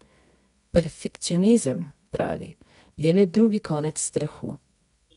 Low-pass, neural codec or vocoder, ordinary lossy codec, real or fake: 10.8 kHz; codec, 24 kHz, 0.9 kbps, WavTokenizer, medium music audio release; none; fake